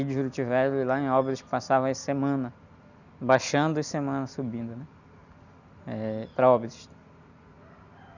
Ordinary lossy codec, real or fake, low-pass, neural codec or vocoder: none; real; 7.2 kHz; none